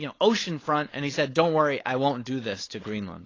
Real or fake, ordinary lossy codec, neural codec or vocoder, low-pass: fake; AAC, 32 kbps; codec, 16 kHz, 4.8 kbps, FACodec; 7.2 kHz